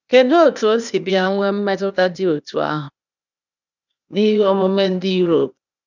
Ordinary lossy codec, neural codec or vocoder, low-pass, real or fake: none; codec, 16 kHz, 0.8 kbps, ZipCodec; 7.2 kHz; fake